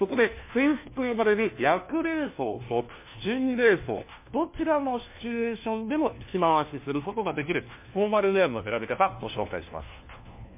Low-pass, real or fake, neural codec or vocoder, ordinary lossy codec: 3.6 kHz; fake; codec, 16 kHz, 1 kbps, FunCodec, trained on LibriTTS, 50 frames a second; MP3, 24 kbps